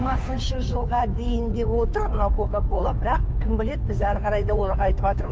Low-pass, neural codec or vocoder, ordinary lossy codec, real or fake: none; codec, 16 kHz, 2 kbps, FunCodec, trained on Chinese and English, 25 frames a second; none; fake